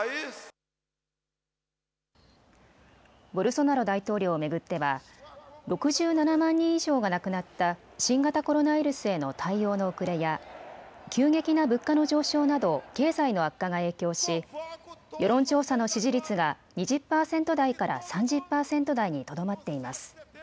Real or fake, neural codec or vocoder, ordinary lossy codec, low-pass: real; none; none; none